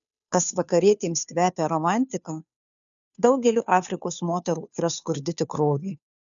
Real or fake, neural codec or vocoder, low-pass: fake; codec, 16 kHz, 2 kbps, FunCodec, trained on Chinese and English, 25 frames a second; 7.2 kHz